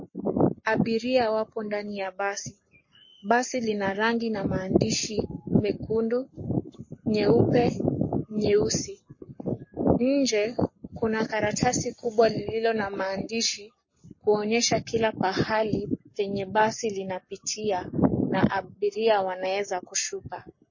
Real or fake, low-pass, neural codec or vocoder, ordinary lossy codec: fake; 7.2 kHz; codec, 44.1 kHz, 7.8 kbps, Pupu-Codec; MP3, 32 kbps